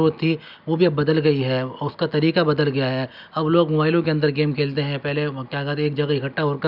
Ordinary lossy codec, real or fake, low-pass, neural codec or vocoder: none; real; 5.4 kHz; none